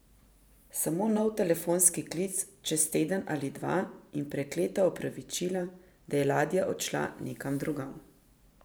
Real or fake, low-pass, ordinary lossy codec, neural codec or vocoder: fake; none; none; vocoder, 44.1 kHz, 128 mel bands every 512 samples, BigVGAN v2